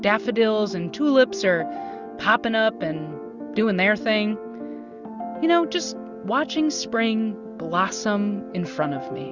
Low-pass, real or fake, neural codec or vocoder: 7.2 kHz; real; none